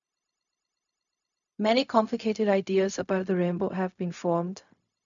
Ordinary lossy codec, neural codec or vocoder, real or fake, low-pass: none; codec, 16 kHz, 0.4 kbps, LongCat-Audio-Codec; fake; 7.2 kHz